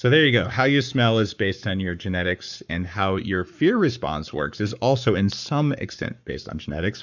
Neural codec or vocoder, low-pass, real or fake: codec, 44.1 kHz, 7.8 kbps, Pupu-Codec; 7.2 kHz; fake